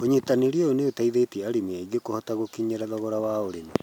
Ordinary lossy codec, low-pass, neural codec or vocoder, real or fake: none; 19.8 kHz; none; real